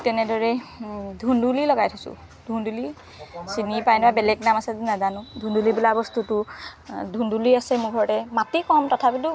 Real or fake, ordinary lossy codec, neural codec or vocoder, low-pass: real; none; none; none